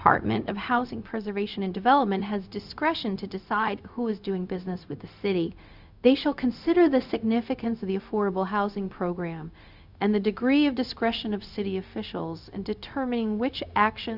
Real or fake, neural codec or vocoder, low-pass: fake; codec, 16 kHz, 0.4 kbps, LongCat-Audio-Codec; 5.4 kHz